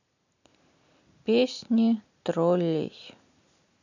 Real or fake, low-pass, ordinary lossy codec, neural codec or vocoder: real; 7.2 kHz; none; none